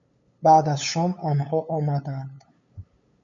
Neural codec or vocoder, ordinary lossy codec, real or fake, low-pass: codec, 16 kHz, 8 kbps, FunCodec, trained on LibriTTS, 25 frames a second; MP3, 48 kbps; fake; 7.2 kHz